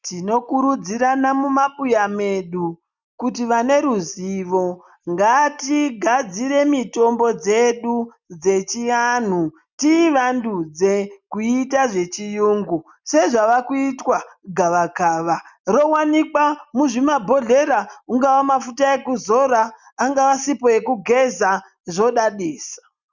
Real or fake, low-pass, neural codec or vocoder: real; 7.2 kHz; none